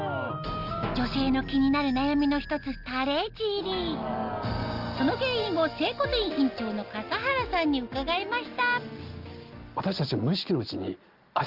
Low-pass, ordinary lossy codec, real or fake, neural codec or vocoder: 5.4 kHz; Opus, 32 kbps; real; none